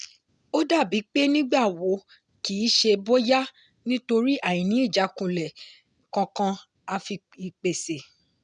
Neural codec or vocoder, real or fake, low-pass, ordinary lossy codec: none; real; 9.9 kHz; none